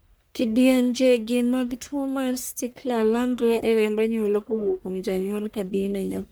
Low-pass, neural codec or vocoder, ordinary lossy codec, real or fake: none; codec, 44.1 kHz, 1.7 kbps, Pupu-Codec; none; fake